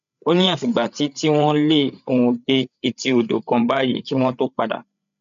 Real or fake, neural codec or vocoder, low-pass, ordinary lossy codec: fake; codec, 16 kHz, 8 kbps, FreqCodec, larger model; 7.2 kHz; MP3, 96 kbps